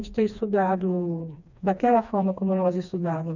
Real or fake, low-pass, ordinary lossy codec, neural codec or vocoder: fake; 7.2 kHz; none; codec, 16 kHz, 2 kbps, FreqCodec, smaller model